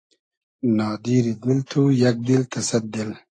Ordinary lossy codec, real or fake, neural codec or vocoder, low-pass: AAC, 48 kbps; real; none; 9.9 kHz